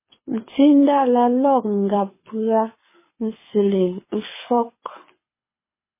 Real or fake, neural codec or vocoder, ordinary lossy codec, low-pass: fake; codec, 24 kHz, 6 kbps, HILCodec; MP3, 16 kbps; 3.6 kHz